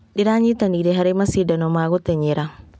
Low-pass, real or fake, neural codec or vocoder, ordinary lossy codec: none; real; none; none